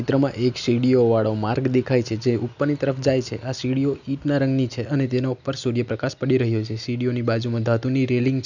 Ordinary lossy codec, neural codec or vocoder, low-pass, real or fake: none; none; 7.2 kHz; real